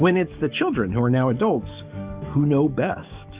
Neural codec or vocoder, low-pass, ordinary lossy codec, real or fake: codec, 44.1 kHz, 7.8 kbps, DAC; 3.6 kHz; Opus, 64 kbps; fake